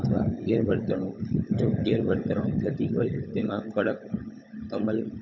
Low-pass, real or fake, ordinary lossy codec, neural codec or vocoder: 7.2 kHz; fake; none; codec, 16 kHz, 16 kbps, FunCodec, trained on LibriTTS, 50 frames a second